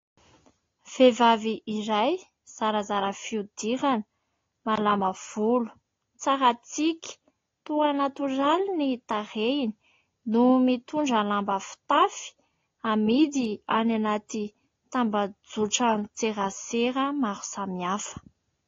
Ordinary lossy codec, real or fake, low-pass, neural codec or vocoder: AAC, 32 kbps; real; 7.2 kHz; none